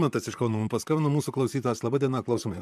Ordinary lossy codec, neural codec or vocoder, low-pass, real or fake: MP3, 96 kbps; vocoder, 44.1 kHz, 128 mel bands, Pupu-Vocoder; 14.4 kHz; fake